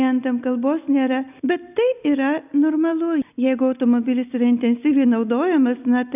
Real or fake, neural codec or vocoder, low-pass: real; none; 3.6 kHz